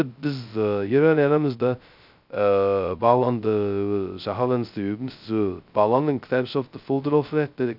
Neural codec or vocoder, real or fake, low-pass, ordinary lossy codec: codec, 16 kHz, 0.2 kbps, FocalCodec; fake; 5.4 kHz; none